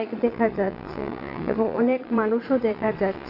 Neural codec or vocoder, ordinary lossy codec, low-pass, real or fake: vocoder, 22.05 kHz, 80 mel bands, Vocos; none; 5.4 kHz; fake